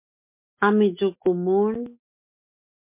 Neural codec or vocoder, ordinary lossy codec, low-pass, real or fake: none; MP3, 24 kbps; 3.6 kHz; real